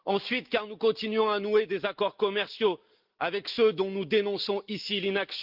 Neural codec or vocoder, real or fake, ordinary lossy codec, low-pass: none; real; Opus, 32 kbps; 5.4 kHz